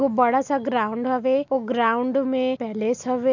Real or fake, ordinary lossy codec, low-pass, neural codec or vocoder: real; none; 7.2 kHz; none